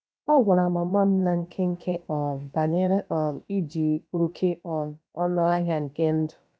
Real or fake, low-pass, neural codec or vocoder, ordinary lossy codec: fake; none; codec, 16 kHz, about 1 kbps, DyCAST, with the encoder's durations; none